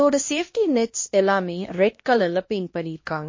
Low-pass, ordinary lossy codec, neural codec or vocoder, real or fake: 7.2 kHz; MP3, 32 kbps; codec, 16 kHz, 1 kbps, X-Codec, HuBERT features, trained on LibriSpeech; fake